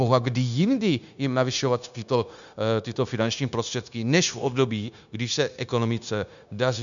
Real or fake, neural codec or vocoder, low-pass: fake; codec, 16 kHz, 0.9 kbps, LongCat-Audio-Codec; 7.2 kHz